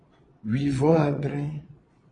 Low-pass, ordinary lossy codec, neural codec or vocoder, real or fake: 9.9 kHz; AAC, 32 kbps; vocoder, 22.05 kHz, 80 mel bands, Vocos; fake